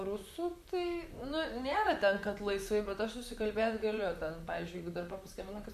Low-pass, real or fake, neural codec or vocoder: 14.4 kHz; fake; vocoder, 44.1 kHz, 128 mel bands, Pupu-Vocoder